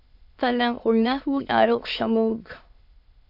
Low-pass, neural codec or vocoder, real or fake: 5.4 kHz; autoencoder, 22.05 kHz, a latent of 192 numbers a frame, VITS, trained on many speakers; fake